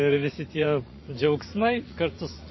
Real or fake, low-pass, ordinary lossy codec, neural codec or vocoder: fake; 7.2 kHz; MP3, 24 kbps; vocoder, 24 kHz, 100 mel bands, Vocos